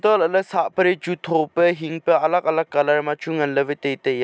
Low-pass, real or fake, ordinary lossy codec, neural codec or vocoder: none; real; none; none